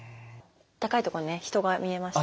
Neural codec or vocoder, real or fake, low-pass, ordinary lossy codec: none; real; none; none